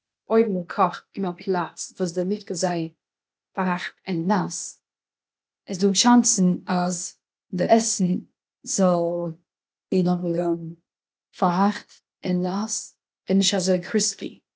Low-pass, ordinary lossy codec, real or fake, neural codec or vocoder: none; none; fake; codec, 16 kHz, 0.8 kbps, ZipCodec